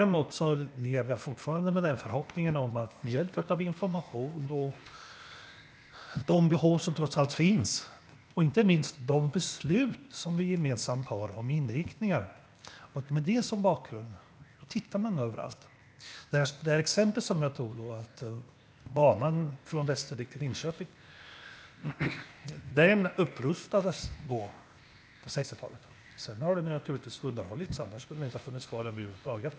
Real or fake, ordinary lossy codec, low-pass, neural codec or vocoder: fake; none; none; codec, 16 kHz, 0.8 kbps, ZipCodec